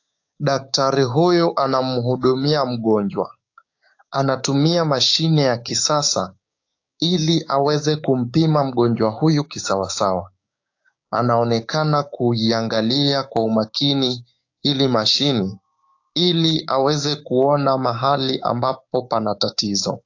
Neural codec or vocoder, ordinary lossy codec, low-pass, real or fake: codec, 16 kHz, 6 kbps, DAC; AAC, 48 kbps; 7.2 kHz; fake